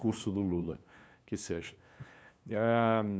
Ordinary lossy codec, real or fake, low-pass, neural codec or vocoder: none; fake; none; codec, 16 kHz, 2 kbps, FunCodec, trained on LibriTTS, 25 frames a second